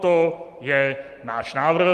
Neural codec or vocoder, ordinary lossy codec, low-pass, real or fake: none; Opus, 24 kbps; 14.4 kHz; real